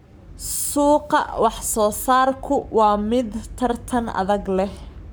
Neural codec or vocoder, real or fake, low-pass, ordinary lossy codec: codec, 44.1 kHz, 7.8 kbps, Pupu-Codec; fake; none; none